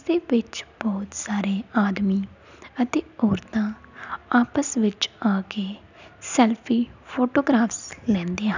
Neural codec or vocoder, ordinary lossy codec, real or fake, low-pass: none; none; real; 7.2 kHz